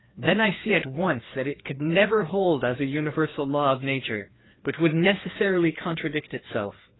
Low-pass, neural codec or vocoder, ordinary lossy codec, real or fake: 7.2 kHz; codec, 16 kHz, 2 kbps, FreqCodec, larger model; AAC, 16 kbps; fake